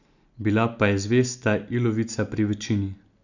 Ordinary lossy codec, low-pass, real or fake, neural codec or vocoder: none; 7.2 kHz; real; none